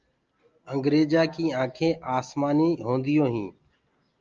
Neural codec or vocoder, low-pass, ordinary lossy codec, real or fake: none; 7.2 kHz; Opus, 24 kbps; real